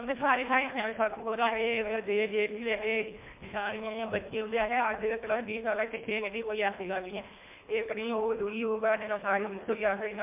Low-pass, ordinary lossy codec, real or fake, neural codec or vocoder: 3.6 kHz; MP3, 32 kbps; fake; codec, 24 kHz, 1.5 kbps, HILCodec